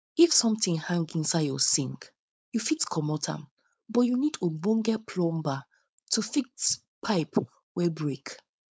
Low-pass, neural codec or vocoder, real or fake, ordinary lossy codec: none; codec, 16 kHz, 4.8 kbps, FACodec; fake; none